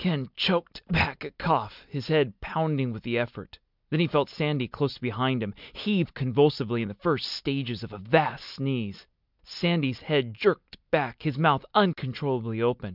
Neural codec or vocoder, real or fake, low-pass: none; real; 5.4 kHz